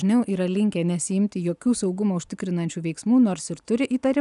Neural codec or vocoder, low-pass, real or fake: none; 10.8 kHz; real